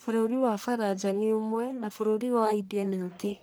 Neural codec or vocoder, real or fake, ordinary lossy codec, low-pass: codec, 44.1 kHz, 1.7 kbps, Pupu-Codec; fake; none; none